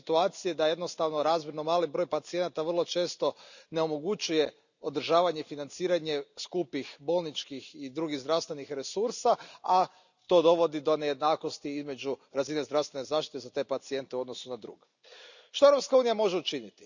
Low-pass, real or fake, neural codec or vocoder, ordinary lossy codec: 7.2 kHz; real; none; none